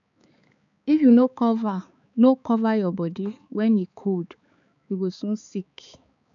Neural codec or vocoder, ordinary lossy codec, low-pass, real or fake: codec, 16 kHz, 4 kbps, X-Codec, HuBERT features, trained on balanced general audio; none; 7.2 kHz; fake